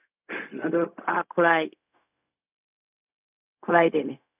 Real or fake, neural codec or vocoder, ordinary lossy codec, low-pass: fake; codec, 16 kHz, 0.4 kbps, LongCat-Audio-Codec; none; 3.6 kHz